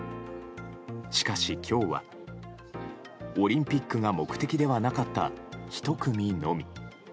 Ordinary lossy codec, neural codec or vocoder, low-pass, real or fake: none; none; none; real